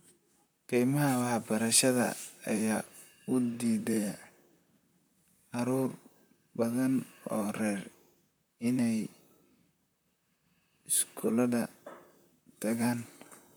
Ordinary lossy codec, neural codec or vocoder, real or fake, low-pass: none; vocoder, 44.1 kHz, 128 mel bands, Pupu-Vocoder; fake; none